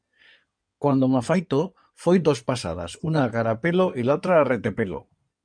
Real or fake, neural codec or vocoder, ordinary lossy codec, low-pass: fake; codec, 16 kHz in and 24 kHz out, 2.2 kbps, FireRedTTS-2 codec; MP3, 96 kbps; 9.9 kHz